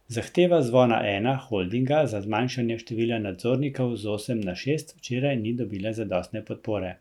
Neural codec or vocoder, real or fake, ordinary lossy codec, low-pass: none; real; none; 19.8 kHz